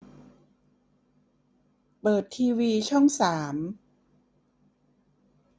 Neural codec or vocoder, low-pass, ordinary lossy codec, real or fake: none; none; none; real